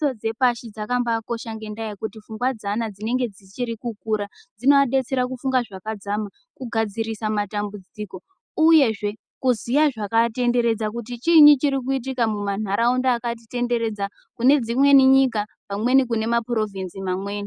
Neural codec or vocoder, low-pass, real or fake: none; 9.9 kHz; real